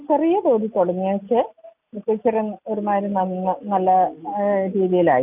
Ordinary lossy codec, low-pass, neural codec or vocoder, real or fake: none; 3.6 kHz; none; real